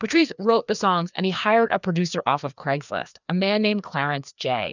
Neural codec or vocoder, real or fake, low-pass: codec, 16 kHz, 2 kbps, FreqCodec, larger model; fake; 7.2 kHz